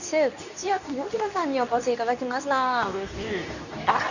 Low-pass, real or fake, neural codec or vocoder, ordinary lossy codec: 7.2 kHz; fake; codec, 24 kHz, 0.9 kbps, WavTokenizer, medium speech release version 2; none